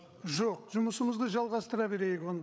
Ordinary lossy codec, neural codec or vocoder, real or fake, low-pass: none; codec, 16 kHz, 8 kbps, FreqCodec, larger model; fake; none